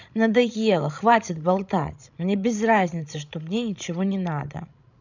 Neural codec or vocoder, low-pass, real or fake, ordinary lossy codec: codec, 16 kHz, 16 kbps, FreqCodec, larger model; 7.2 kHz; fake; none